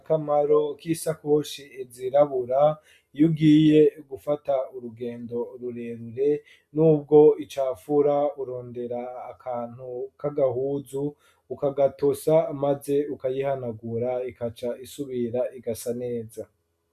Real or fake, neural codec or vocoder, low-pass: real; none; 14.4 kHz